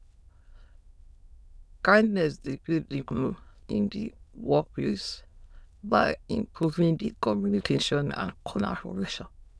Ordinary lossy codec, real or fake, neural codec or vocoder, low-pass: none; fake; autoencoder, 22.05 kHz, a latent of 192 numbers a frame, VITS, trained on many speakers; none